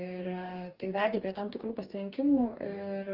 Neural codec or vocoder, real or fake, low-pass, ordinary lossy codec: codec, 44.1 kHz, 2.6 kbps, DAC; fake; 5.4 kHz; Opus, 24 kbps